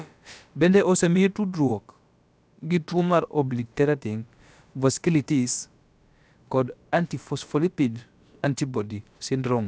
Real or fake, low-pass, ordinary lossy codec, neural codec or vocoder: fake; none; none; codec, 16 kHz, about 1 kbps, DyCAST, with the encoder's durations